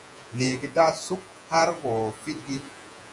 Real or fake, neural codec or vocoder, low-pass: fake; vocoder, 48 kHz, 128 mel bands, Vocos; 10.8 kHz